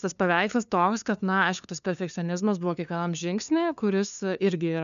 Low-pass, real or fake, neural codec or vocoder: 7.2 kHz; fake; codec, 16 kHz, 2 kbps, FunCodec, trained on LibriTTS, 25 frames a second